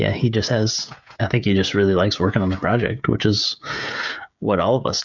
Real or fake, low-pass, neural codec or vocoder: fake; 7.2 kHz; codec, 16 kHz, 16 kbps, FreqCodec, smaller model